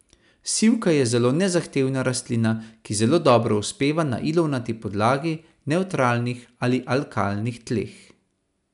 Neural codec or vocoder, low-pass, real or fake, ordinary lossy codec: none; 10.8 kHz; real; none